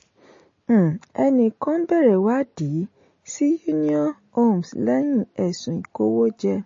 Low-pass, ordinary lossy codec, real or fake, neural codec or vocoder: 7.2 kHz; MP3, 32 kbps; real; none